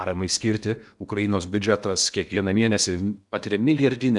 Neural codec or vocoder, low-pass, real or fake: codec, 16 kHz in and 24 kHz out, 0.8 kbps, FocalCodec, streaming, 65536 codes; 10.8 kHz; fake